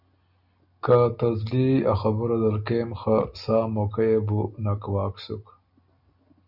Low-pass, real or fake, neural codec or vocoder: 5.4 kHz; real; none